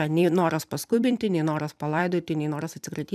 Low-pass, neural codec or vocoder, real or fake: 14.4 kHz; vocoder, 44.1 kHz, 128 mel bands every 256 samples, BigVGAN v2; fake